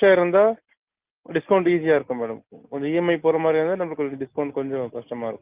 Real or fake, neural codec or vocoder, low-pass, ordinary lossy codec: real; none; 3.6 kHz; Opus, 24 kbps